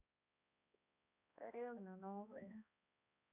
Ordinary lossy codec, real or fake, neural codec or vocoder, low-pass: none; fake; codec, 16 kHz, 1 kbps, X-Codec, HuBERT features, trained on balanced general audio; 3.6 kHz